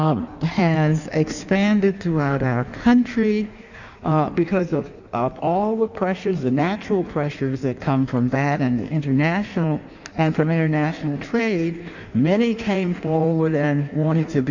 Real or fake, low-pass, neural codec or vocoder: fake; 7.2 kHz; codec, 16 kHz in and 24 kHz out, 1.1 kbps, FireRedTTS-2 codec